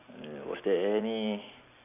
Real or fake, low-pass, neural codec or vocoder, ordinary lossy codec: real; 3.6 kHz; none; none